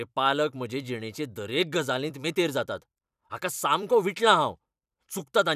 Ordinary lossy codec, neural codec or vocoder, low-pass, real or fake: none; vocoder, 44.1 kHz, 128 mel bands every 256 samples, BigVGAN v2; 14.4 kHz; fake